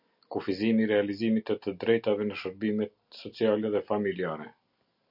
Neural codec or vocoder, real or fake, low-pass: none; real; 5.4 kHz